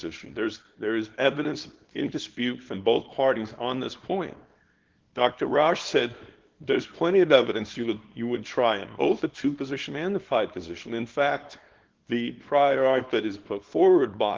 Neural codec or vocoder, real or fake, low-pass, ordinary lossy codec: codec, 24 kHz, 0.9 kbps, WavTokenizer, small release; fake; 7.2 kHz; Opus, 16 kbps